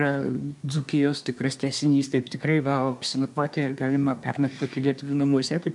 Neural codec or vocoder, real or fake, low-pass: codec, 24 kHz, 1 kbps, SNAC; fake; 10.8 kHz